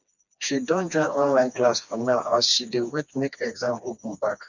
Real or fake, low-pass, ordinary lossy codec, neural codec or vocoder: fake; 7.2 kHz; none; codec, 16 kHz, 2 kbps, FreqCodec, smaller model